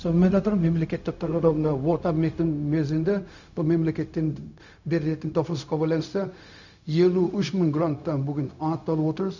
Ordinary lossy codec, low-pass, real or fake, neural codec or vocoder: none; 7.2 kHz; fake; codec, 16 kHz, 0.4 kbps, LongCat-Audio-Codec